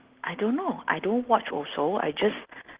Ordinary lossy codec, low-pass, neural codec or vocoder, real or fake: Opus, 16 kbps; 3.6 kHz; none; real